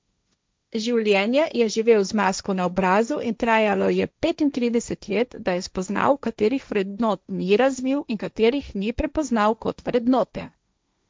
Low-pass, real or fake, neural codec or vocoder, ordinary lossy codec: none; fake; codec, 16 kHz, 1.1 kbps, Voila-Tokenizer; none